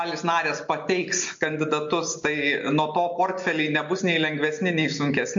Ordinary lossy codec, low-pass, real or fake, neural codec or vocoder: MP3, 48 kbps; 7.2 kHz; real; none